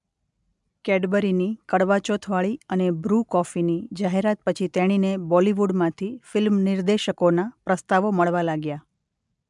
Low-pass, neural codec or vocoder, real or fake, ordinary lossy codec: 10.8 kHz; none; real; MP3, 96 kbps